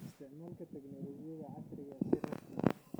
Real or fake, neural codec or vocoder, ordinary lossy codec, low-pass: real; none; none; none